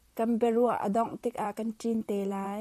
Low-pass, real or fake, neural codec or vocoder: 14.4 kHz; fake; vocoder, 44.1 kHz, 128 mel bands, Pupu-Vocoder